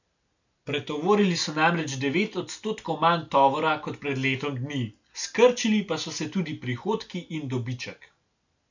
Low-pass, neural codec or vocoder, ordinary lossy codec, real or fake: 7.2 kHz; none; none; real